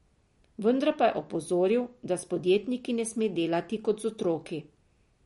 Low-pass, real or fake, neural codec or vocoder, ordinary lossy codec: 14.4 kHz; real; none; MP3, 48 kbps